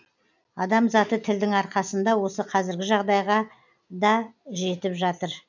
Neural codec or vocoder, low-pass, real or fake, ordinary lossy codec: none; 7.2 kHz; real; none